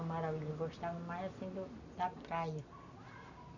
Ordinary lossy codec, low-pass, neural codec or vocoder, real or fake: none; 7.2 kHz; none; real